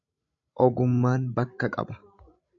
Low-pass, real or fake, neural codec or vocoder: 7.2 kHz; fake; codec, 16 kHz, 16 kbps, FreqCodec, larger model